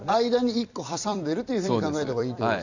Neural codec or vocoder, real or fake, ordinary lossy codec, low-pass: vocoder, 44.1 kHz, 128 mel bands every 256 samples, BigVGAN v2; fake; none; 7.2 kHz